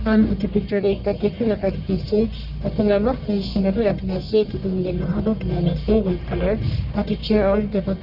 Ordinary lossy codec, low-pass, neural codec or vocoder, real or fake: none; 5.4 kHz; codec, 44.1 kHz, 1.7 kbps, Pupu-Codec; fake